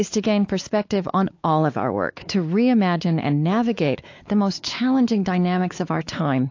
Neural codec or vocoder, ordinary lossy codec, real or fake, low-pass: codec, 16 kHz, 4 kbps, X-Codec, WavLM features, trained on Multilingual LibriSpeech; AAC, 48 kbps; fake; 7.2 kHz